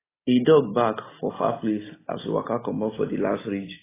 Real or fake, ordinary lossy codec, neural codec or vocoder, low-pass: real; AAC, 16 kbps; none; 3.6 kHz